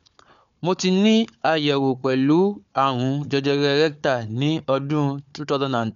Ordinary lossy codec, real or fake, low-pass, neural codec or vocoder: none; fake; 7.2 kHz; codec, 16 kHz, 4 kbps, FunCodec, trained on Chinese and English, 50 frames a second